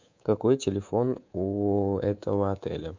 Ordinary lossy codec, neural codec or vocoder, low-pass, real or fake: MP3, 64 kbps; codec, 24 kHz, 3.1 kbps, DualCodec; 7.2 kHz; fake